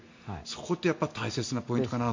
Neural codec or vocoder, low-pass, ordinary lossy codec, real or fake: none; 7.2 kHz; MP3, 48 kbps; real